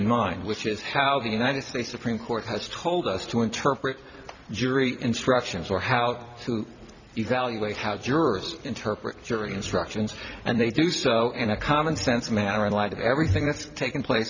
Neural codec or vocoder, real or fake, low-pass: vocoder, 44.1 kHz, 128 mel bands every 256 samples, BigVGAN v2; fake; 7.2 kHz